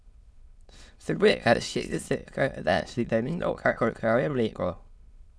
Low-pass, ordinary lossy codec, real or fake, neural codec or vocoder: none; none; fake; autoencoder, 22.05 kHz, a latent of 192 numbers a frame, VITS, trained on many speakers